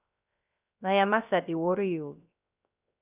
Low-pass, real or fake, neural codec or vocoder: 3.6 kHz; fake; codec, 16 kHz, 0.3 kbps, FocalCodec